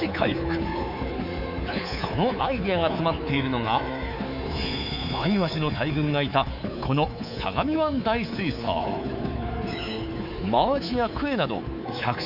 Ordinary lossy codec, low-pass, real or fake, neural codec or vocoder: none; 5.4 kHz; fake; codec, 24 kHz, 3.1 kbps, DualCodec